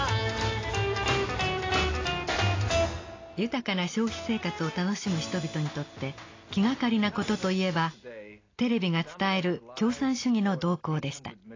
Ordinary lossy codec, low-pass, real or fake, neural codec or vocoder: AAC, 32 kbps; 7.2 kHz; real; none